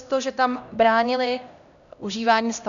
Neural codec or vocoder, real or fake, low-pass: codec, 16 kHz, 1 kbps, X-Codec, HuBERT features, trained on LibriSpeech; fake; 7.2 kHz